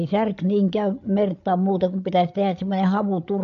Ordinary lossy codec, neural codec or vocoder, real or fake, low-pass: AAC, 64 kbps; codec, 16 kHz, 8 kbps, FreqCodec, larger model; fake; 7.2 kHz